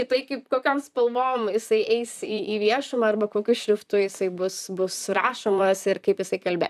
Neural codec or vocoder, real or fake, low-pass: vocoder, 44.1 kHz, 128 mel bands, Pupu-Vocoder; fake; 14.4 kHz